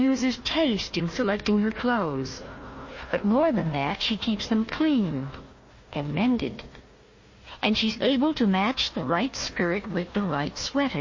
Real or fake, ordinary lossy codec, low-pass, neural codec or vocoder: fake; MP3, 32 kbps; 7.2 kHz; codec, 16 kHz, 1 kbps, FunCodec, trained on Chinese and English, 50 frames a second